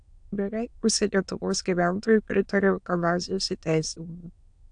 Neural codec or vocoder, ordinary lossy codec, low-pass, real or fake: autoencoder, 22.05 kHz, a latent of 192 numbers a frame, VITS, trained on many speakers; AAC, 64 kbps; 9.9 kHz; fake